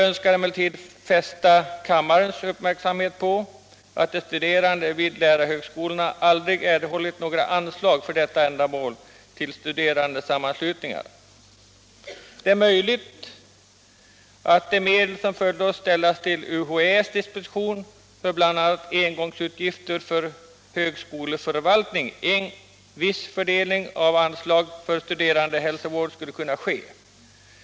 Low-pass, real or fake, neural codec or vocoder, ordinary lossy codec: none; real; none; none